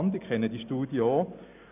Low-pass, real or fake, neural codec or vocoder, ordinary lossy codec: 3.6 kHz; real; none; none